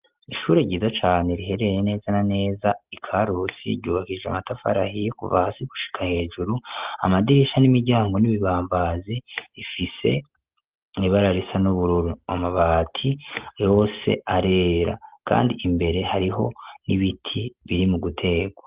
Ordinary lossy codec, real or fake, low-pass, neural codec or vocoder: Opus, 32 kbps; real; 3.6 kHz; none